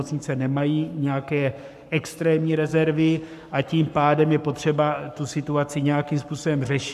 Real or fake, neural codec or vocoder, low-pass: fake; codec, 44.1 kHz, 7.8 kbps, Pupu-Codec; 14.4 kHz